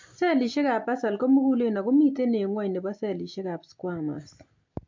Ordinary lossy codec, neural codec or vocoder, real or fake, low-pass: MP3, 48 kbps; none; real; 7.2 kHz